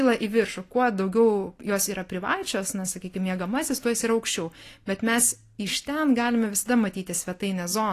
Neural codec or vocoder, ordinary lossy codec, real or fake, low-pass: none; AAC, 48 kbps; real; 14.4 kHz